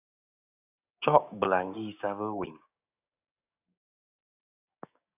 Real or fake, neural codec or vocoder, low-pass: fake; codec, 16 kHz, 6 kbps, DAC; 3.6 kHz